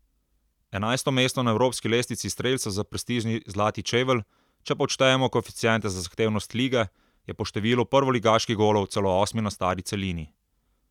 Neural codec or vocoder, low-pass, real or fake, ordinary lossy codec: none; 19.8 kHz; real; none